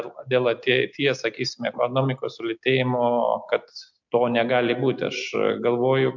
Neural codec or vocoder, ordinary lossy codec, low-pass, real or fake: none; MP3, 64 kbps; 7.2 kHz; real